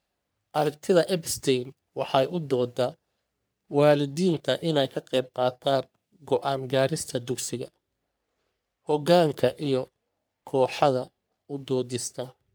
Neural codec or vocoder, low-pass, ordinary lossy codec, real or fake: codec, 44.1 kHz, 3.4 kbps, Pupu-Codec; none; none; fake